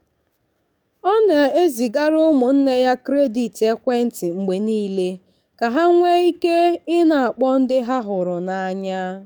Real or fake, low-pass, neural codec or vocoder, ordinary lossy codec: fake; 19.8 kHz; codec, 44.1 kHz, 7.8 kbps, DAC; none